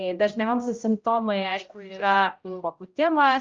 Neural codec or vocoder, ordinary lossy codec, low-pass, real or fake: codec, 16 kHz, 0.5 kbps, X-Codec, HuBERT features, trained on general audio; Opus, 24 kbps; 7.2 kHz; fake